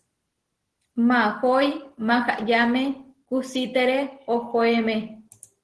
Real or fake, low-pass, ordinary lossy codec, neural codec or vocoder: real; 10.8 kHz; Opus, 16 kbps; none